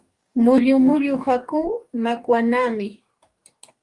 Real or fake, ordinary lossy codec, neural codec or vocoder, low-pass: fake; Opus, 32 kbps; codec, 44.1 kHz, 2.6 kbps, DAC; 10.8 kHz